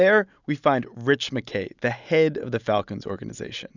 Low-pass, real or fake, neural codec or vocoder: 7.2 kHz; real; none